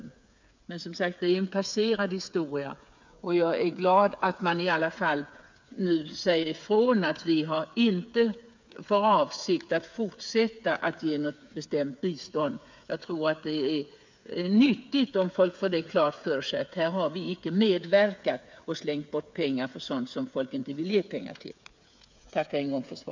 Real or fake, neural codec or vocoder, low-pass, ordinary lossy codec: fake; codec, 16 kHz, 8 kbps, FreqCodec, smaller model; 7.2 kHz; MP3, 64 kbps